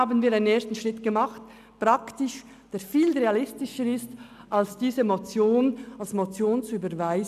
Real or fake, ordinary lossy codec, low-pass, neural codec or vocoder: real; none; 14.4 kHz; none